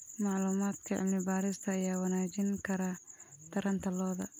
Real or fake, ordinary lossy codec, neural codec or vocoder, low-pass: real; none; none; none